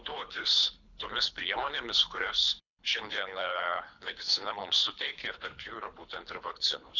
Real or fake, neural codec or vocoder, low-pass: fake; codec, 24 kHz, 3 kbps, HILCodec; 7.2 kHz